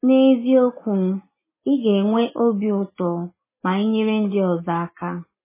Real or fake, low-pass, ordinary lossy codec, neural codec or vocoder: real; 3.6 kHz; MP3, 16 kbps; none